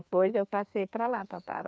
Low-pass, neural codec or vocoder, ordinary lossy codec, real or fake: none; codec, 16 kHz, 2 kbps, FreqCodec, larger model; none; fake